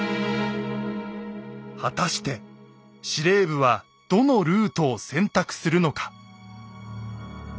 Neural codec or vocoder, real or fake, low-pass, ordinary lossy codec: none; real; none; none